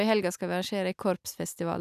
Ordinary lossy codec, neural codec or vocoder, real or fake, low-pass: none; none; real; 14.4 kHz